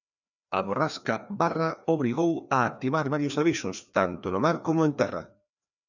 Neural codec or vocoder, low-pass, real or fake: codec, 16 kHz, 2 kbps, FreqCodec, larger model; 7.2 kHz; fake